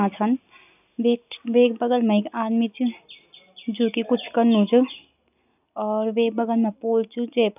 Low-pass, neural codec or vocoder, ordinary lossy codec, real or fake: 3.6 kHz; none; none; real